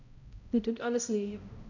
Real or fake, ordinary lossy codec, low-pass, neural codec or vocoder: fake; none; 7.2 kHz; codec, 16 kHz, 0.5 kbps, X-Codec, HuBERT features, trained on balanced general audio